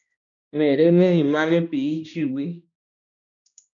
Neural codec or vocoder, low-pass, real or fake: codec, 16 kHz, 1 kbps, X-Codec, HuBERT features, trained on balanced general audio; 7.2 kHz; fake